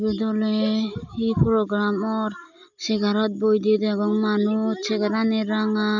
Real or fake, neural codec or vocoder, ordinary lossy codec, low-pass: real; none; none; 7.2 kHz